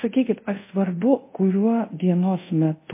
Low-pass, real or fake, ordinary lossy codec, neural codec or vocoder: 3.6 kHz; fake; MP3, 24 kbps; codec, 24 kHz, 0.5 kbps, DualCodec